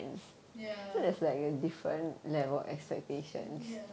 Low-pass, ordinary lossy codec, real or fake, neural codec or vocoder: none; none; real; none